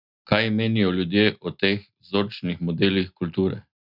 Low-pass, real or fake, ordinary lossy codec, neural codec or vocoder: 5.4 kHz; real; none; none